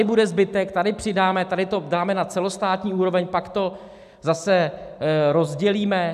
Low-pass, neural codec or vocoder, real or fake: 14.4 kHz; none; real